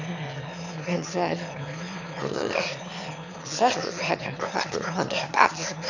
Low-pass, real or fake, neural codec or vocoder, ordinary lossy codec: 7.2 kHz; fake; autoencoder, 22.05 kHz, a latent of 192 numbers a frame, VITS, trained on one speaker; none